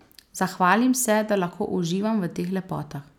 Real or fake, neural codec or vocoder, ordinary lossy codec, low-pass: real; none; none; 19.8 kHz